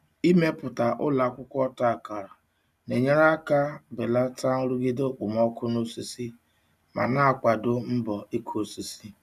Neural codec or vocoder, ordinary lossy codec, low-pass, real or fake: none; none; 14.4 kHz; real